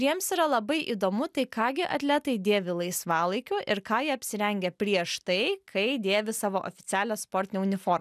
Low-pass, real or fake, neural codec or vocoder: 14.4 kHz; real; none